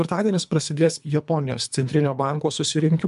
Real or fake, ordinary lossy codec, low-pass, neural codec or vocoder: fake; MP3, 96 kbps; 10.8 kHz; codec, 24 kHz, 3 kbps, HILCodec